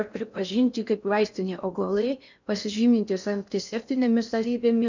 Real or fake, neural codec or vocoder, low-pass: fake; codec, 16 kHz in and 24 kHz out, 0.6 kbps, FocalCodec, streaming, 4096 codes; 7.2 kHz